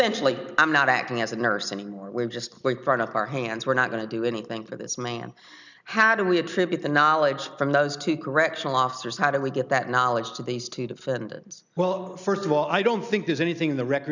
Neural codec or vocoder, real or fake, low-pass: none; real; 7.2 kHz